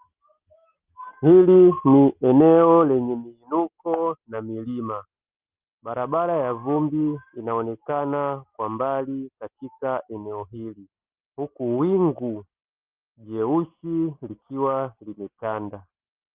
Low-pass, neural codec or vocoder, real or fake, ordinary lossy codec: 3.6 kHz; none; real; Opus, 32 kbps